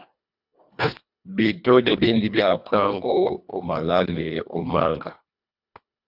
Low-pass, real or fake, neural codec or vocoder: 5.4 kHz; fake; codec, 24 kHz, 1.5 kbps, HILCodec